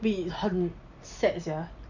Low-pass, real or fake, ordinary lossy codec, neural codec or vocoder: 7.2 kHz; real; none; none